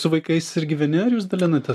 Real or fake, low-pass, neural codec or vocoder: real; 14.4 kHz; none